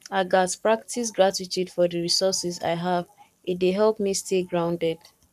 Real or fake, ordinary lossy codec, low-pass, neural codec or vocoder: fake; none; 14.4 kHz; codec, 44.1 kHz, 7.8 kbps, Pupu-Codec